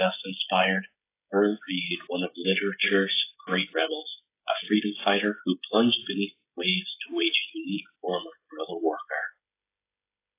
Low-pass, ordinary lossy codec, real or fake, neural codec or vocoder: 3.6 kHz; AAC, 24 kbps; fake; codec, 16 kHz, 16 kbps, FreqCodec, smaller model